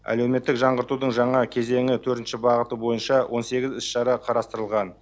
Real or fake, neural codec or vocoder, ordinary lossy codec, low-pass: real; none; none; none